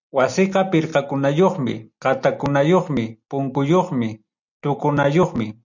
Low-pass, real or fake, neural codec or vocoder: 7.2 kHz; real; none